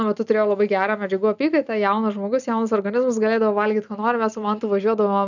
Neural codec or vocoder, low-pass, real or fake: none; 7.2 kHz; real